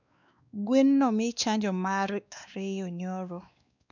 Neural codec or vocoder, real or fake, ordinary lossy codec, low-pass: codec, 16 kHz, 2 kbps, X-Codec, WavLM features, trained on Multilingual LibriSpeech; fake; none; 7.2 kHz